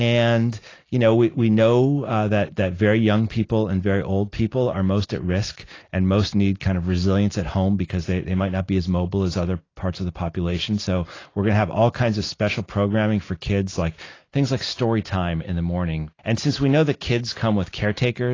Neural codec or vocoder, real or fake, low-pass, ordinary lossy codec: none; real; 7.2 kHz; AAC, 32 kbps